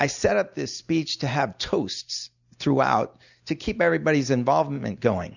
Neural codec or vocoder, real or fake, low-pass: none; real; 7.2 kHz